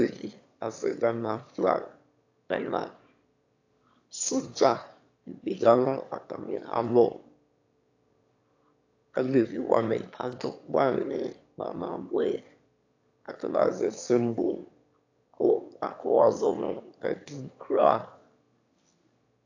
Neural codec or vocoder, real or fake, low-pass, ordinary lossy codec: autoencoder, 22.05 kHz, a latent of 192 numbers a frame, VITS, trained on one speaker; fake; 7.2 kHz; AAC, 48 kbps